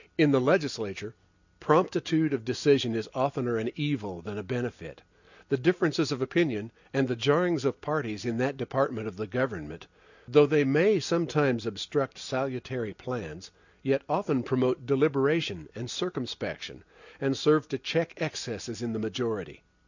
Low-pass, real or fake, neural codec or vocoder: 7.2 kHz; real; none